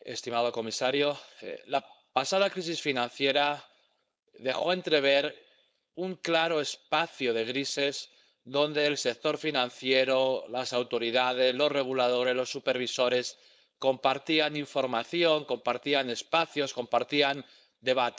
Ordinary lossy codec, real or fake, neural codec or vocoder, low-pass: none; fake; codec, 16 kHz, 4.8 kbps, FACodec; none